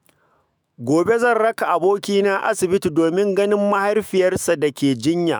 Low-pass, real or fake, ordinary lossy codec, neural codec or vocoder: none; fake; none; autoencoder, 48 kHz, 128 numbers a frame, DAC-VAE, trained on Japanese speech